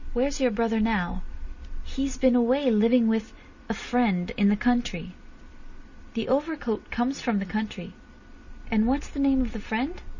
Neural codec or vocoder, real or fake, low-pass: none; real; 7.2 kHz